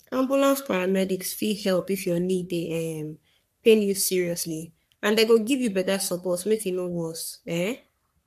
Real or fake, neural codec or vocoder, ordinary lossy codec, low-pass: fake; codec, 44.1 kHz, 3.4 kbps, Pupu-Codec; none; 14.4 kHz